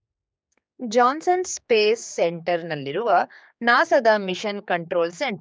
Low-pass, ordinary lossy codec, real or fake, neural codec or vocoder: none; none; fake; codec, 16 kHz, 4 kbps, X-Codec, HuBERT features, trained on general audio